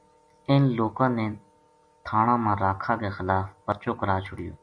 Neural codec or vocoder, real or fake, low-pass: none; real; 9.9 kHz